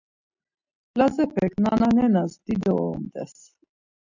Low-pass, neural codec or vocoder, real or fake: 7.2 kHz; none; real